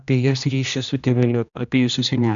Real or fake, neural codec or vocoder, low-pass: fake; codec, 16 kHz, 1 kbps, X-Codec, HuBERT features, trained on general audio; 7.2 kHz